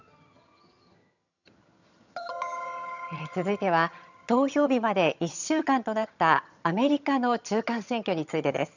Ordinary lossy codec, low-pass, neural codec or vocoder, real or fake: none; 7.2 kHz; vocoder, 22.05 kHz, 80 mel bands, HiFi-GAN; fake